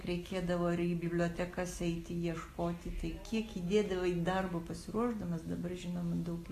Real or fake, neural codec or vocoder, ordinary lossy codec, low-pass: real; none; AAC, 48 kbps; 14.4 kHz